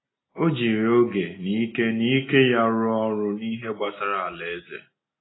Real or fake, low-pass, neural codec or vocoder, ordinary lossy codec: real; 7.2 kHz; none; AAC, 16 kbps